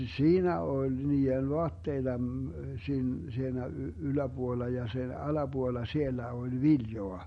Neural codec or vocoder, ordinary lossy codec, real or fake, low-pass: none; MP3, 48 kbps; real; 19.8 kHz